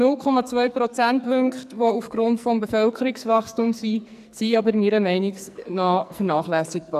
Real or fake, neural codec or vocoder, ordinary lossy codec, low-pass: fake; codec, 44.1 kHz, 2.6 kbps, SNAC; none; 14.4 kHz